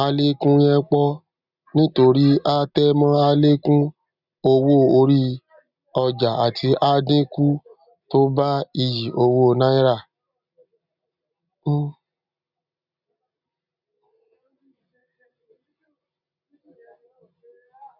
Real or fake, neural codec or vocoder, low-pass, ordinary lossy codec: real; none; 5.4 kHz; none